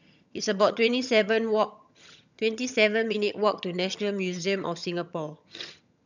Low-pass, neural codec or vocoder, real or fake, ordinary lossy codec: 7.2 kHz; vocoder, 22.05 kHz, 80 mel bands, HiFi-GAN; fake; none